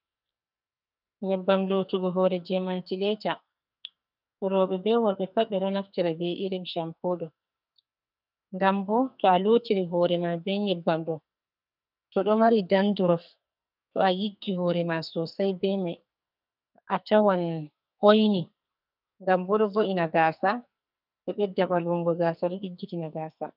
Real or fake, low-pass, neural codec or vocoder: fake; 5.4 kHz; codec, 44.1 kHz, 2.6 kbps, SNAC